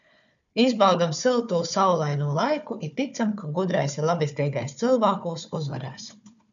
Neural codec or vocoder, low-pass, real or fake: codec, 16 kHz, 4 kbps, FunCodec, trained on Chinese and English, 50 frames a second; 7.2 kHz; fake